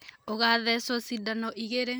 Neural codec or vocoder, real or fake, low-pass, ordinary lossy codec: none; real; none; none